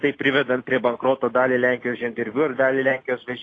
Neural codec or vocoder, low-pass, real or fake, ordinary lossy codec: none; 9.9 kHz; real; AAC, 32 kbps